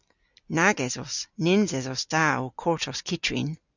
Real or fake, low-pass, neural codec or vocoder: real; 7.2 kHz; none